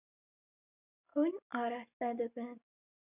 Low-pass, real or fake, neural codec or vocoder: 3.6 kHz; real; none